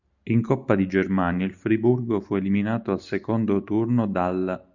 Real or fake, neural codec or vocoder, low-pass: real; none; 7.2 kHz